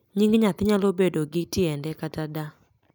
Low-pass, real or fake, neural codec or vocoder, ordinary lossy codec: none; real; none; none